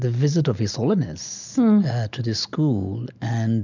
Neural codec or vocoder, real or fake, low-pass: none; real; 7.2 kHz